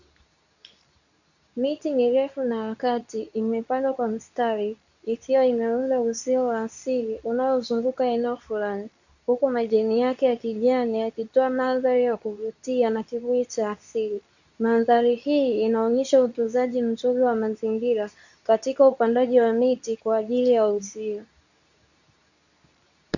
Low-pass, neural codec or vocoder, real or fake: 7.2 kHz; codec, 24 kHz, 0.9 kbps, WavTokenizer, medium speech release version 2; fake